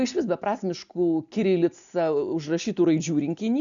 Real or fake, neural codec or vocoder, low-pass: real; none; 7.2 kHz